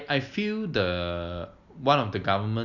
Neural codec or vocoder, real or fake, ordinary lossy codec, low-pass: none; real; none; 7.2 kHz